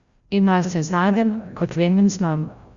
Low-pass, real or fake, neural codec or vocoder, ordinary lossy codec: 7.2 kHz; fake; codec, 16 kHz, 0.5 kbps, FreqCodec, larger model; Opus, 64 kbps